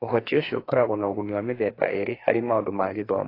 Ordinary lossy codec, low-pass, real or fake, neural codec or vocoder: AAC, 24 kbps; 5.4 kHz; fake; codec, 44.1 kHz, 2.6 kbps, SNAC